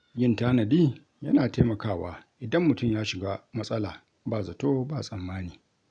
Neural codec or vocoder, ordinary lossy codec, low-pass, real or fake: none; none; 9.9 kHz; real